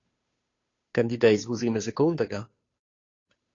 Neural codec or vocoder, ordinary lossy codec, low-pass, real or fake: codec, 16 kHz, 2 kbps, FunCodec, trained on Chinese and English, 25 frames a second; AAC, 32 kbps; 7.2 kHz; fake